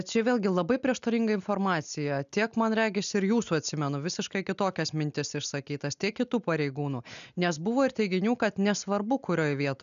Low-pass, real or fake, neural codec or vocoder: 7.2 kHz; real; none